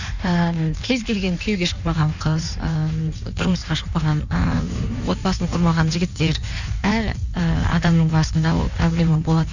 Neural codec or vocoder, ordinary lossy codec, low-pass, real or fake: codec, 16 kHz in and 24 kHz out, 1.1 kbps, FireRedTTS-2 codec; none; 7.2 kHz; fake